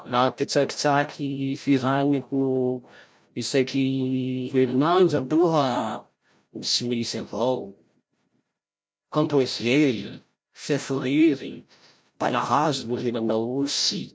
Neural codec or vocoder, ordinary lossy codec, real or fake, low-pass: codec, 16 kHz, 0.5 kbps, FreqCodec, larger model; none; fake; none